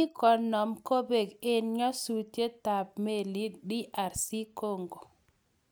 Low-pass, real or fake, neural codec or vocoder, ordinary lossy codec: none; real; none; none